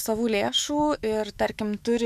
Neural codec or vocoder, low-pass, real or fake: autoencoder, 48 kHz, 128 numbers a frame, DAC-VAE, trained on Japanese speech; 14.4 kHz; fake